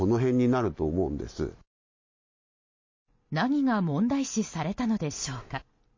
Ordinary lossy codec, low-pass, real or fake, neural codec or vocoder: MP3, 32 kbps; 7.2 kHz; real; none